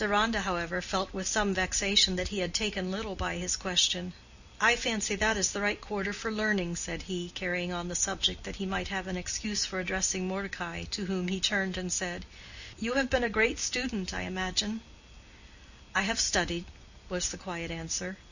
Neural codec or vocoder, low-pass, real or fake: none; 7.2 kHz; real